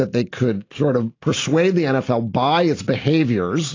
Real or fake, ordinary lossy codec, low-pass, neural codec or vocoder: real; AAC, 32 kbps; 7.2 kHz; none